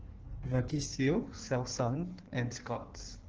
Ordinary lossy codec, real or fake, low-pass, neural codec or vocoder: Opus, 16 kbps; fake; 7.2 kHz; codec, 16 kHz in and 24 kHz out, 1.1 kbps, FireRedTTS-2 codec